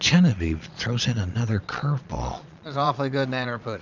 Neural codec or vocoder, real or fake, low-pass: vocoder, 22.05 kHz, 80 mel bands, Vocos; fake; 7.2 kHz